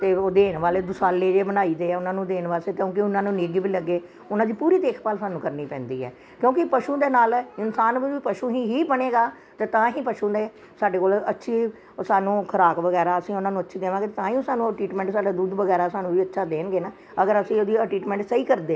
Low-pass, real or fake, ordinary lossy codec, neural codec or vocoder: none; real; none; none